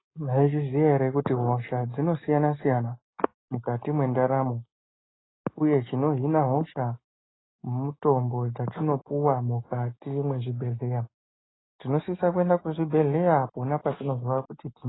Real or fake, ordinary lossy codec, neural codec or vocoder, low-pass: real; AAC, 16 kbps; none; 7.2 kHz